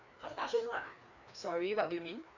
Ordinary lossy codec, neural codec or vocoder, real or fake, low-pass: none; codec, 16 kHz, 2 kbps, FreqCodec, larger model; fake; 7.2 kHz